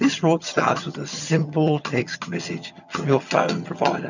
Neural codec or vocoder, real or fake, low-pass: vocoder, 22.05 kHz, 80 mel bands, HiFi-GAN; fake; 7.2 kHz